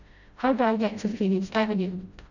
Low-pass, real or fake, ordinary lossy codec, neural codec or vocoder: 7.2 kHz; fake; none; codec, 16 kHz, 0.5 kbps, FreqCodec, smaller model